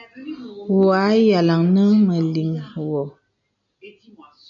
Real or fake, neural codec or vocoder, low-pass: real; none; 7.2 kHz